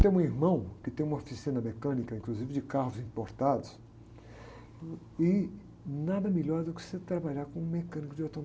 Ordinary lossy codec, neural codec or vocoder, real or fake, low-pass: none; none; real; none